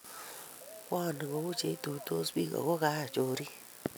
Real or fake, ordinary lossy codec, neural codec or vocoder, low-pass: real; none; none; none